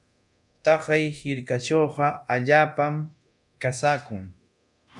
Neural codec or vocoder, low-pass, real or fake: codec, 24 kHz, 0.9 kbps, DualCodec; 10.8 kHz; fake